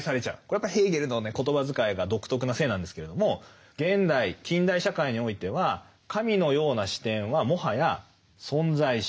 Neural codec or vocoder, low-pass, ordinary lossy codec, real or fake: none; none; none; real